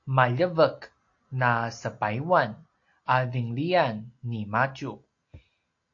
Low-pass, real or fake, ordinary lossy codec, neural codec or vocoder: 7.2 kHz; real; AAC, 48 kbps; none